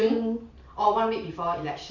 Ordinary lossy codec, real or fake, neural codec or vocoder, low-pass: none; fake; vocoder, 44.1 kHz, 128 mel bands every 512 samples, BigVGAN v2; 7.2 kHz